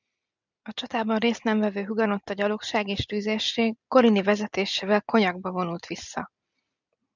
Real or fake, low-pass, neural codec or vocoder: real; 7.2 kHz; none